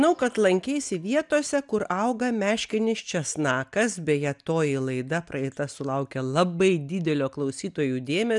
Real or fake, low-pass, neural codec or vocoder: real; 10.8 kHz; none